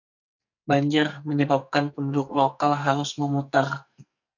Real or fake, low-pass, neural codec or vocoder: fake; 7.2 kHz; codec, 44.1 kHz, 2.6 kbps, SNAC